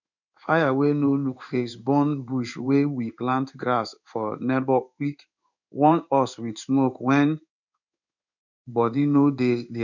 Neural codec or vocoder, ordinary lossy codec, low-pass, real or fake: codec, 16 kHz in and 24 kHz out, 1 kbps, XY-Tokenizer; none; 7.2 kHz; fake